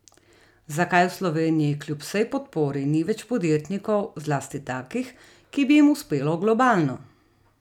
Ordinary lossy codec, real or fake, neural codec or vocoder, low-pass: none; real; none; 19.8 kHz